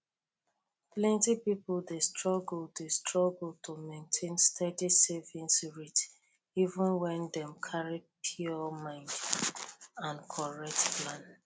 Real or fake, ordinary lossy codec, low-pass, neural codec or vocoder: real; none; none; none